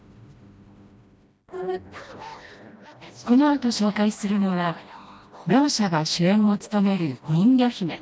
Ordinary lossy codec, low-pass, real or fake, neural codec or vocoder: none; none; fake; codec, 16 kHz, 1 kbps, FreqCodec, smaller model